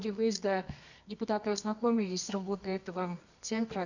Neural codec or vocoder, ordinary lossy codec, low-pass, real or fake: codec, 24 kHz, 0.9 kbps, WavTokenizer, medium music audio release; none; 7.2 kHz; fake